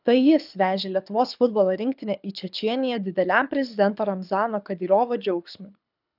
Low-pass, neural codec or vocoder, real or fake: 5.4 kHz; codec, 24 kHz, 6 kbps, HILCodec; fake